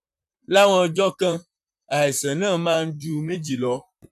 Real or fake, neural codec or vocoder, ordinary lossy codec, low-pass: fake; vocoder, 44.1 kHz, 128 mel bands, Pupu-Vocoder; none; 14.4 kHz